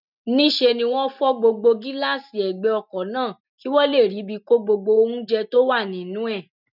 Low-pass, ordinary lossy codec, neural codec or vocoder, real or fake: 5.4 kHz; none; none; real